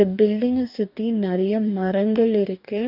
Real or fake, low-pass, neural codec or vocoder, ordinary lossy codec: fake; 5.4 kHz; codec, 44.1 kHz, 2.6 kbps, DAC; none